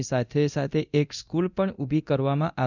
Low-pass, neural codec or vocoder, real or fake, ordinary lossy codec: 7.2 kHz; codec, 24 kHz, 0.9 kbps, DualCodec; fake; none